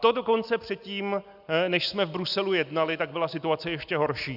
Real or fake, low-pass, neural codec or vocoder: real; 5.4 kHz; none